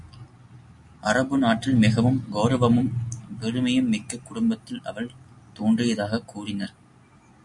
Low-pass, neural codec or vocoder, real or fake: 10.8 kHz; none; real